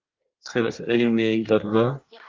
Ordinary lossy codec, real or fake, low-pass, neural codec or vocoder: Opus, 24 kbps; fake; 7.2 kHz; codec, 24 kHz, 1 kbps, SNAC